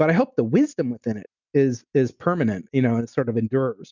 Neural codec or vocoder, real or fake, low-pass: none; real; 7.2 kHz